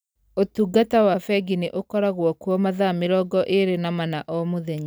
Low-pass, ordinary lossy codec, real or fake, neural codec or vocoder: none; none; real; none